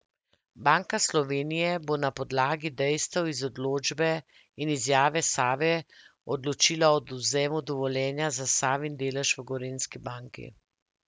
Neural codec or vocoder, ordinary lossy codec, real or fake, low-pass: none; none; real; none